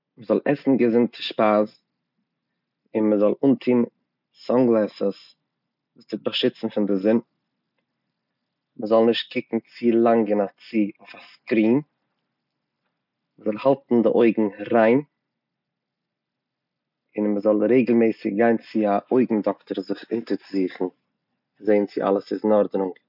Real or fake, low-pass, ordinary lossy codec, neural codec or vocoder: real; 5.4 kHz; none; none